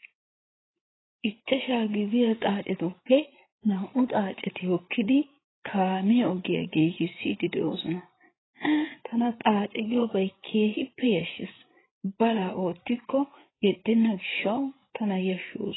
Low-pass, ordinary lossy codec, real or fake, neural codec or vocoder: 7.2 kHz; AAC, 16 kbps; fake; codec, 16 kHz, 8 kbps, FreqCodec, larger model